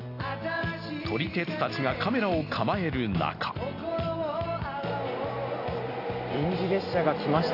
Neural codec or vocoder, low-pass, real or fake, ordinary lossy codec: none; 5.4 kHz; real; AAC, 32 kbps